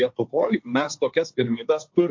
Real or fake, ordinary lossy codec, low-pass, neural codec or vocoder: fake; MP3, 48 kbps; 7.2 kHz; codec, 16 kHz, 1.1 kbps, Voila-Tokenizer